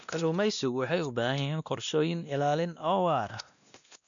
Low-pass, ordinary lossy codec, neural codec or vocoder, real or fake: 7.2 kHz; none; codec, 16 kHz, 1 kbps, X-Codec, WavLM features, trained on Multilingual LibriSpeech; fake